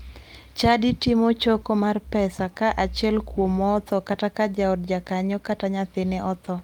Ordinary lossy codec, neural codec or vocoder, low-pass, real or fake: Opus, 32 kbps; none; 19.8 kHz; real